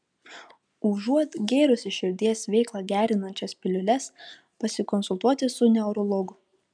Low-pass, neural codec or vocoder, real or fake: 9.9 kHz; none; real